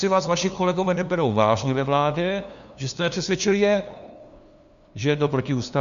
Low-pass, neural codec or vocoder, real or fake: 7.2 kHz; codec, 16 kHz, 2 kbps, FunCodec, trained on LibriTTS, 25 frames a second; fake